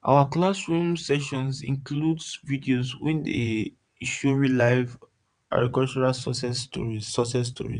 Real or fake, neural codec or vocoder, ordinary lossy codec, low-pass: fake; vocoder, 22.05 kHz, 80 mel bands, WaveNeXt; none; 9.9 kHz